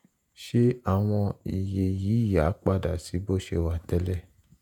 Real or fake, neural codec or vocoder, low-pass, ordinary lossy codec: fake; vocoder, 48 kHz, 128 mel bands, Vocos; 19.8 kHz; none